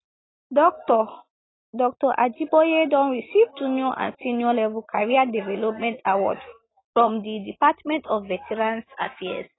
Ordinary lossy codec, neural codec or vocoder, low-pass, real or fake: AAC, 16 kbps; none; 7.2 kHz; real